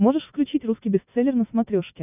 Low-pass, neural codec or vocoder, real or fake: 3.6 kHz; none; real